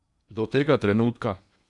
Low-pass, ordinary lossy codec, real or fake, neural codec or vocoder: 10.8 kHz; none; fake; codec, 16 kHz in and 24 kHz out, 0.8 kbps, FocalCodec, streaming, 65536 codes